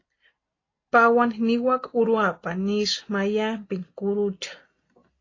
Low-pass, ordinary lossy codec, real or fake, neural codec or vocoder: 7.2 kHz; AAC, 32 kbps; real; none